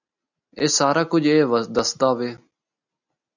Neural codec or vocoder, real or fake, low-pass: none; real; 7.2 kHz